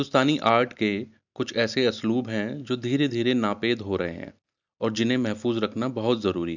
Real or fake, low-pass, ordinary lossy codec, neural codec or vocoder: real; 7.2 kHz; none; none